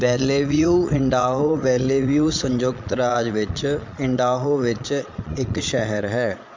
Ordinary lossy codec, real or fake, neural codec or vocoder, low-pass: MP3, 64 kbps; fake; vocoder, 22.05 kHz, 80 mel bands, Vocos; 7.2 kHz